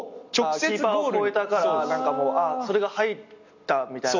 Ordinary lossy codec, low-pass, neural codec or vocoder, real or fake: none; 7.2 kHz; none; real